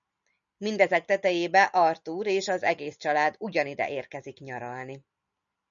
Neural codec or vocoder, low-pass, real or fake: none; 7.2 kHz; real